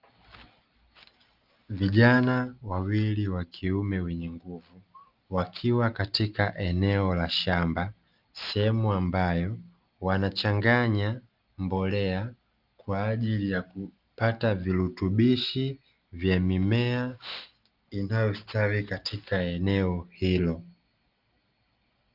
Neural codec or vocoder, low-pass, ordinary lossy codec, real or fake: none; 5.4 kHz; Opus, 32 kbps; real